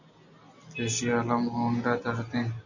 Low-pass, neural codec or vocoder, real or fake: 7.2 kHz; none; real